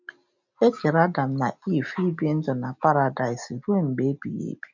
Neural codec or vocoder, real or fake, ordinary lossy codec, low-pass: none; real; none; 7.2 kHz